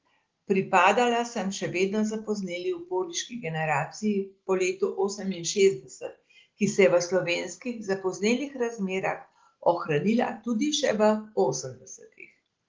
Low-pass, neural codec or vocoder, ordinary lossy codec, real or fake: 7.2 kHz; none; Opus, 16 kbps; real